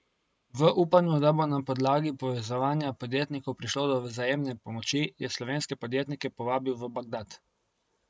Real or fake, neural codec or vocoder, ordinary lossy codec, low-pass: real; none; none; none